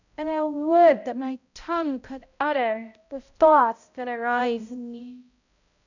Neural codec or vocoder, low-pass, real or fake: codec, 16 kHz, 0.5 kbps, X-Codec, HuBERT features, trained on balanced general audio; 7.2 kHz; fake